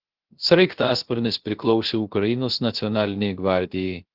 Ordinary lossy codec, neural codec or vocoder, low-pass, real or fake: Opus, 16 kbps; codec, 16 kHz, 0.3 kbps, FocalCodec; 5.4 kHz; fake